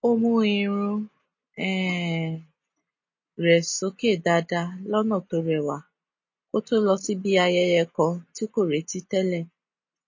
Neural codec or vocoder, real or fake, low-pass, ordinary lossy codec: none; real; 7.2 kHz; MP3, 32 kbps